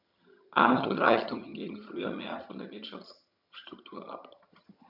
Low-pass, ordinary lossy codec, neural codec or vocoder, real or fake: 5.4 kHz; none; vocoder, 22.05 kHz, 80 mel bands, HiFi-GAN; fake